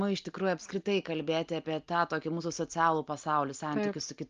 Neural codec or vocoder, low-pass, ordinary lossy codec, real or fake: none; 7.2 kHz; Opus, 32 kbps; real